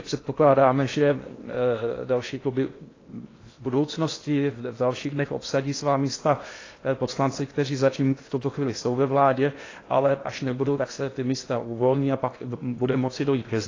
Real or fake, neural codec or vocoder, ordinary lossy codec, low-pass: fake; codec, 16 kHz in and 24 kHz out, 0.8 kbps, FocalCodec, streaming, 65536 codes; AAC, 32 kbps; 7.2 kHz